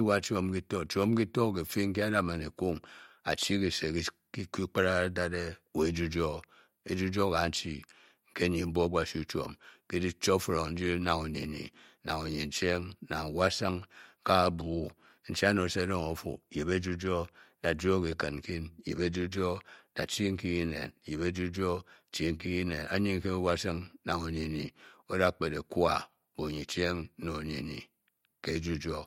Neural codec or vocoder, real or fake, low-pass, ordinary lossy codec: none; real; 19.8 kHz; MP3, 64 kbps